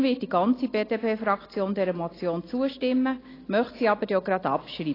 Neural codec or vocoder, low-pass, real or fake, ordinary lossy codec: none; 5.4 kHz; real; AAC, 24 kbps